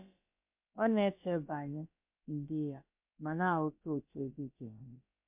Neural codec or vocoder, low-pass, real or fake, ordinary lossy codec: codec, 16 kHz, about 1 kbps, DyCAST, with the encoder's durations; 3.6 kHz; fake; MP3, 32 kbps